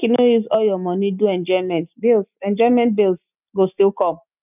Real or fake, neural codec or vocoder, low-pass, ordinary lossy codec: real; none; 3.6 kHz; none